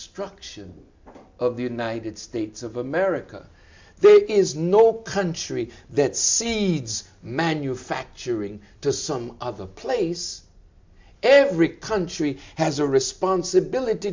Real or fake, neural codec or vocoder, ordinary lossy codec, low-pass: real; none; MP3, 64 kbps; 7.2 kHz